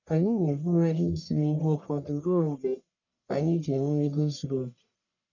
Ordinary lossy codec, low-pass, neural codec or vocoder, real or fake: none; 7.2 kHz; codec, 44.1 kHz, 1.7 kbps, Pupu-Codec; fake